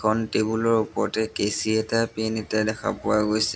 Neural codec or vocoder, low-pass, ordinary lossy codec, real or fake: none; none; none; real